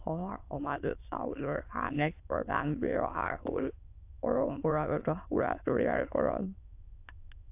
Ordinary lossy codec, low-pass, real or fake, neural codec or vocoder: AAC, 32 kbps; 3.6 kHz; fake; autoencoder, 22.05 kHz, a latent of 192 numbers a frame, VITS, trained on many speakers